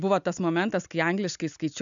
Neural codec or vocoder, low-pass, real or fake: none; 7.2 kHz; real